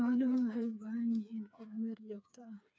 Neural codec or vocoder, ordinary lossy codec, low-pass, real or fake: codec, 16 kHz, 4 kbps, FreqCodec, smaller model; none; none; fake